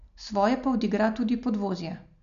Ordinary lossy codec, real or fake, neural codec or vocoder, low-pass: none; real; none; 7.2 kHz